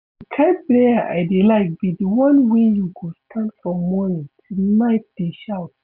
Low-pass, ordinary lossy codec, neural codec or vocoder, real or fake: 5.4 kHz; none; none; real